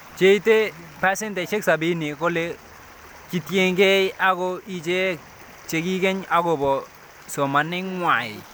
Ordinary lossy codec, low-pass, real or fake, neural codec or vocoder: none; none; real; none